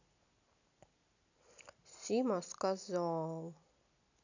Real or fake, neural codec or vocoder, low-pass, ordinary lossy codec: real; none; 7.2 kHz; none